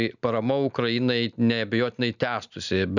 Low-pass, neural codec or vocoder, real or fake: 7.2 kHz; none; real